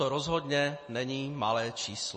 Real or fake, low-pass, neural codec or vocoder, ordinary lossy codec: real; 10.8 kHz; none; MP3, 32 kbps